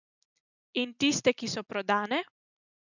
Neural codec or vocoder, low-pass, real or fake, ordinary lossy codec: none; 7.2 kHz; real; none